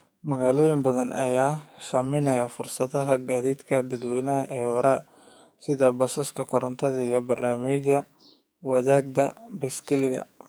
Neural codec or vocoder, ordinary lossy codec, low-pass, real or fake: codec, 44.1 kHz, 2.6 kbps, SNAC; none; none; fake